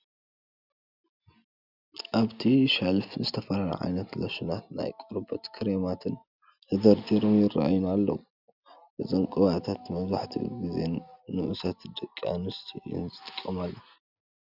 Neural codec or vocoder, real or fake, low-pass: none; real; 5.4 kHz